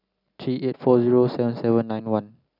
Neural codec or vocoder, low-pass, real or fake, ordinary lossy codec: none; 5.4 kHz; real; none